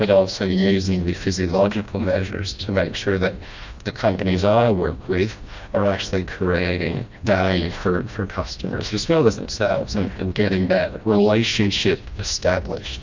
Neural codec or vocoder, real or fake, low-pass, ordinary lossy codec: codec, 16 kHz, 1 kbps, FreqCodec, smaller model; fake; 7.2 kHz; MP3, 64 kbps